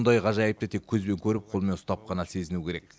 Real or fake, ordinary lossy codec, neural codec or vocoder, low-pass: real; none; none; none